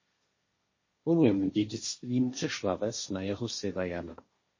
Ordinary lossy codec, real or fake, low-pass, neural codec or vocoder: MP3, 32 kbps; fake; 7.2 kHz; codec, 16 kHz, 1.1 kbps, Voila-Tokenizer